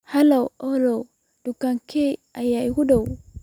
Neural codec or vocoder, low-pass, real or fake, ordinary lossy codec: none; 19.8 kHz; real; none